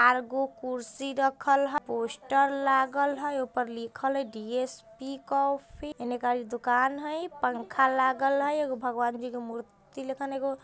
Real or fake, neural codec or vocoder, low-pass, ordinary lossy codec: real; none; none; none